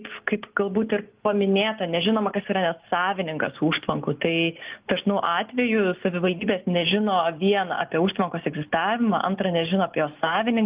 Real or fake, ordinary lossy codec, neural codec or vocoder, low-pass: real; Opus, 32 kbps; none; 3.6 kHz